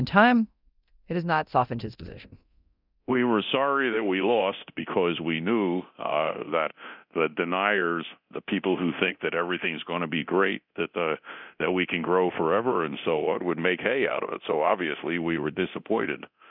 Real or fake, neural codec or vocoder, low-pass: fake; codec, 24 kHz, 0.9 kbps, DualCodec; 5.4 kHz